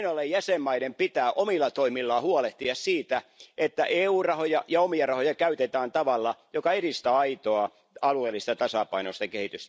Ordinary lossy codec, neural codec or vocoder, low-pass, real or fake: none; none; none; real